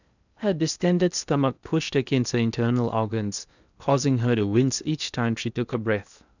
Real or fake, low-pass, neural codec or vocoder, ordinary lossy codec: fake; 7.2 kHz; codec, 16 kHz in and 24 kHz out, 0.8 kbps, FocalCodec, streaming, 65536 codes; none